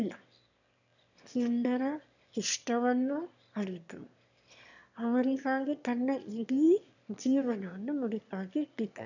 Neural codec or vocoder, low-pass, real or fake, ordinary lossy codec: autoencoder, 22.05 kHz, a latent of 192 numbers a frame, VITS, trained on one speaker; 7.2 kHz; fake; none